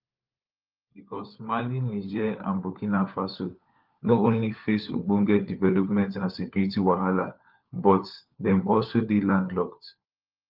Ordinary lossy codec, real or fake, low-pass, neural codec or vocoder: Opus, 24 kbps; fake; 5.4 kHz; codec, 16 kHz, 4 kbps, FunCodec, trained on LibriTTS, 50 frames a second